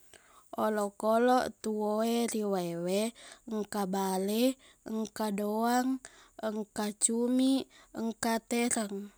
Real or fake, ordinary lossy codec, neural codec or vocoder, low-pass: real; none; none; none